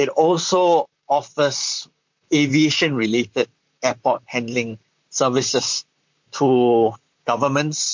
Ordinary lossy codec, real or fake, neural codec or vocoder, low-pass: MP3, 48 kbps; fake; vocoder, 44.1 kHz, 128 mel bands, Pupu-Vocoder; 7.2 kHz